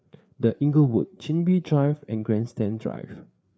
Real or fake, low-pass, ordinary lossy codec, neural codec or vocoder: fake; none; none; codec, 16 kHz, 4 kbps, FreqCodec, larger model